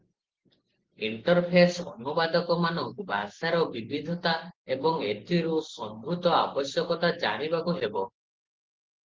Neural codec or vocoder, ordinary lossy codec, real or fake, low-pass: none; Opus, 16 kbps; real; 7.2 kHz